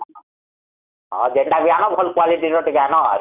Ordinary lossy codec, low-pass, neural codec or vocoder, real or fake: none; 3.6 kHz; none; real